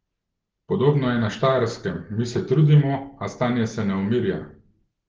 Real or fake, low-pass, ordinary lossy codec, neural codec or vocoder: real; 7.2 kHz; Opus, 16 kbps; none